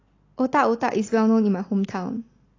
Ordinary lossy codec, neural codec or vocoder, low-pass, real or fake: AAC, 32 kbps; none; 7.2 kHz; real